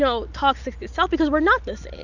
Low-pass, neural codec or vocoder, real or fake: 7.2 kHz; none; real